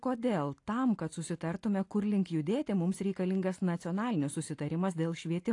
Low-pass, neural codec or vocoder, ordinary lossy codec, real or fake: 10.8 kHz; none; AAC, 48 kbps; real